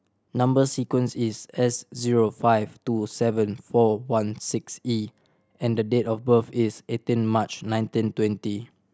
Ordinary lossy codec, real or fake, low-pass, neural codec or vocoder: none; real; none; none